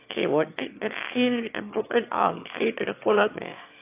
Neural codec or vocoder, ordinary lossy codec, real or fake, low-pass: autoencoder, 22.05 kHz, a latent of 192 numbers a frame, VITS, trained on one speaker; AAC, 32 kbps; fake; 3.6 kHz